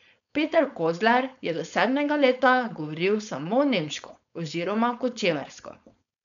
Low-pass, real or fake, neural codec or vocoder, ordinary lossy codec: 7.2 kHz; fake; codec, 16 kHz, 4.8 kbps, FACodec; none